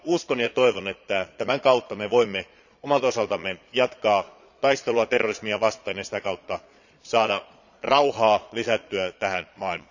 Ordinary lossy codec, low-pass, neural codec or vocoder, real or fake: none; 7.2 kHz; vocoder, 22.05 kHz, 80 mel bands, Vocos; fake